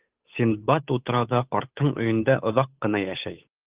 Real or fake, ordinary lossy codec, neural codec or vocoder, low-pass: fake; Opus, 32 kbps; codec, 16 kHz, 8 kbps, FunCodec, trained on Chinese and English, 25 frames a second; 3.6 kHz